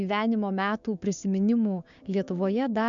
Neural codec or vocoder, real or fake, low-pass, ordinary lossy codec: codec, 16 kHz, 6 kbps, DAC; fake; 7.2 kHz; MP3, 64 kbps